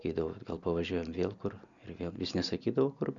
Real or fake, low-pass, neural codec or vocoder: real; 7.2 kHz; none